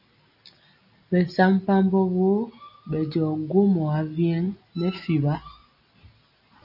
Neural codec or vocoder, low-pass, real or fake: none; 5.4 kHz; real